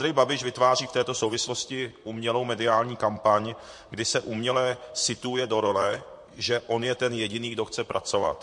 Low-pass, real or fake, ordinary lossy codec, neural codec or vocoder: 10.8 kHz; fake; MP3, 48 kbps; vocoder, 44.1 kHz, 128 mel bands, Pupu-Vocoder